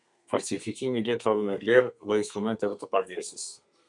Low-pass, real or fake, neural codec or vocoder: 10.8 kHz; fake; codec, 32 kHz, 1.9 kbps, SNAC